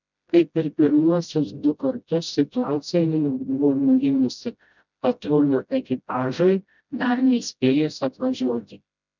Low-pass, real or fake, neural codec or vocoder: 7.2 kHz; fake; codec, 16 kHz, 0.5 kbps, FreqCodec, smaller model